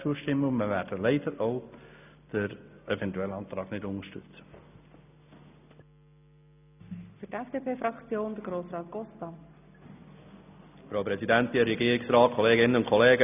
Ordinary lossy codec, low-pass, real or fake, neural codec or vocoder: none; 3.6 kHz; real; none